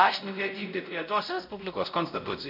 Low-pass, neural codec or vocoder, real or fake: 5.4 kHz; codec, 16 kHz, 0.5 kbps, X-Codec, WavLM features, trained on Multilingual LibriSpeech; fake